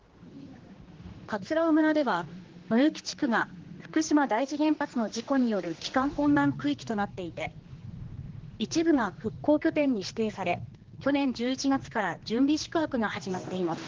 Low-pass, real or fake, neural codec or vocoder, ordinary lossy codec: 7.2 kHz; fake; codec, 16 kHz, 2 kbps, X-Codec, HuBERT features, trained on general audio; Opus, 16 kbps